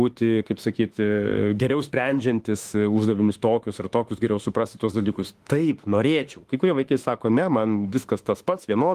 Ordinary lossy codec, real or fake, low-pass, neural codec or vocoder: Opus, 24 kbps; fake; 14.4 kHz; autoencoder, 48 kHz, 32 numbers a frame, DAC-VAE, trained on Japanese speech